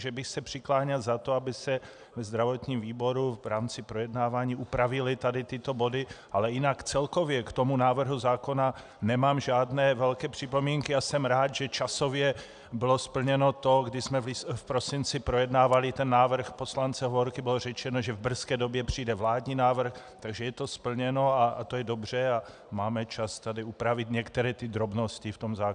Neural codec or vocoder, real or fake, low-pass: none; real; 9.9 kHz